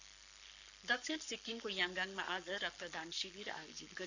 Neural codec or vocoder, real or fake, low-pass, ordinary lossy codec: codec, 44.1 kHz, 7.8 kbps, Pupu-Codec; fake; 7.2 kHz; none